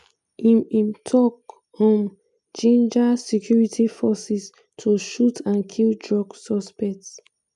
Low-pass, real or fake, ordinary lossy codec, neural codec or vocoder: 10.8 kHz; real; none; none